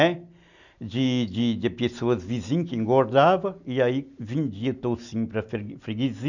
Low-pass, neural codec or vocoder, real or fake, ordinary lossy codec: 7.2 kHz; none; real; none